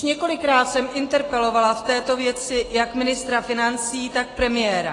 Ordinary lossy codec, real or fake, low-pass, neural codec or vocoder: AAC, 32 kbps; real; 10.8 kHz; none